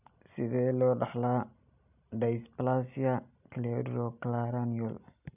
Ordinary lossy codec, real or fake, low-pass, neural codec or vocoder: none; real; 3.6 kHz; none